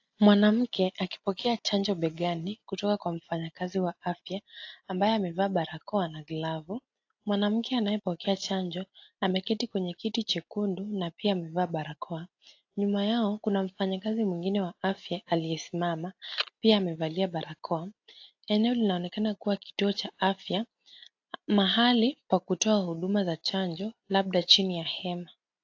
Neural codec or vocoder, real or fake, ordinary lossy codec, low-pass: none; real; AAC, 48 kbps; 7.2 kHz